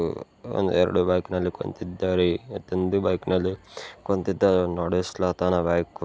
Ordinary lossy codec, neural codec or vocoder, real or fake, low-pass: none; none; real; none